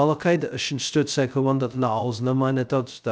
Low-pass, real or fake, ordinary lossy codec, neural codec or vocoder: none; fake; none; codec, 16 kHz, 0.2 kbps, FocalCodec